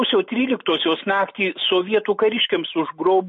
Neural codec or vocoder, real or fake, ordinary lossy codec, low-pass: none; real; MP3, 32 kbps; 7.2 kHz